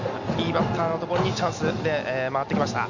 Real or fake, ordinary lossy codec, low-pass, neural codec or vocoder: real; none; 7.2 kHz; none